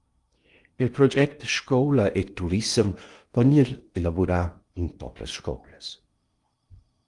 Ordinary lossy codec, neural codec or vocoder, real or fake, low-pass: Opus, 32 kbps; codec, 16 kHz in and 24 kHz out, 0.8 kbps, FocalCodec, streaming, 65536 codes; fake; 10.8 kHz